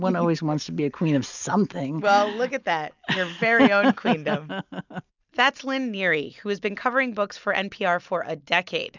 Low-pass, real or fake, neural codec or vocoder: 7.2 kHz; real; none